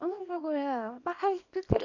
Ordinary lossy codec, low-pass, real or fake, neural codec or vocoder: none; 7.2 kHz; fake; codec, 24 kHz, 0.9 kbps, WavTokenizer, small release